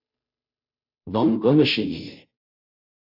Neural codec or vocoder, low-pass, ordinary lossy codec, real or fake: codec, 16 kHz, 0.5 kbps, FunCodec, trained on Chinese and English, 25 frames a second; 5.4 kHz; AAC, 48 kbps; fake